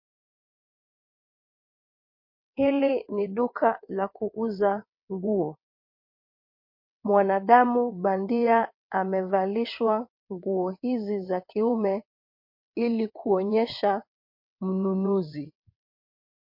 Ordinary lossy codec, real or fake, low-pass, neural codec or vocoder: MP3, 32 kbps; fake; 5.4 kHz; vocoder, 22.05 kHz, 80 mel bands, WaveNeXt